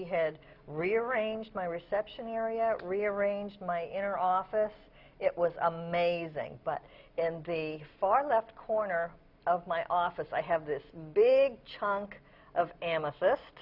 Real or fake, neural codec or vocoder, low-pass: real; none; 5.4 kHz